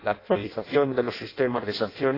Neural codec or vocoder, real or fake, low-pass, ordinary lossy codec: codec, 16 kHz in and 24 kHz out, 0.6 kbps, FireRedTTS-2 codec; fake; 5.4 kHz; AAC, 24 kbps